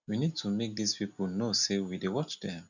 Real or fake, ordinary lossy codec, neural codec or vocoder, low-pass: real; none; none; 7.2 kHz